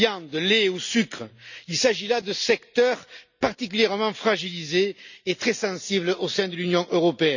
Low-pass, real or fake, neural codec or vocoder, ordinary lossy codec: 7.2 kHz; real; none; none